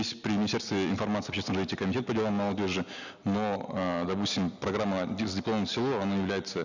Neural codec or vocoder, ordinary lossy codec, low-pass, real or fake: none; none; 7.2 kHz; real